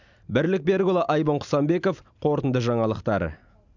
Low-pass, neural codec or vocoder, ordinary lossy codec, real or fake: 7.2 kHz; none; none; real